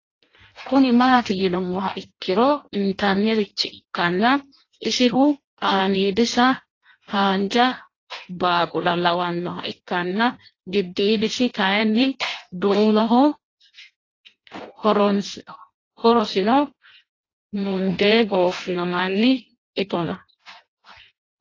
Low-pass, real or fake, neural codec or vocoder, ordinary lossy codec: 7.2 kHz; fake; codec, 16 kHz in and 24 kHz out, 0.6 kbps, FireRedTTS-2 codec; AAC, 32 kbps